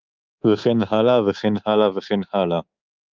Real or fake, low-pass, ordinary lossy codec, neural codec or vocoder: fake; 7.2 kHz; Opus, 32 kbps; codec, 24 kHz, 3.1 kbps, DualCodec